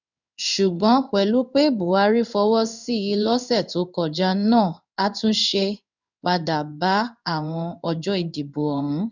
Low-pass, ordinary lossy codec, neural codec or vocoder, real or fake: 7.2 kHz; none; codec, 16 kHz in and 24 kHz out, 1 kbps, XY-Tokenizer; fake